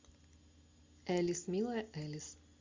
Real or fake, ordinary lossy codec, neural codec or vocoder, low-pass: real; MP3, 64 kbps; none; 7.2 kHz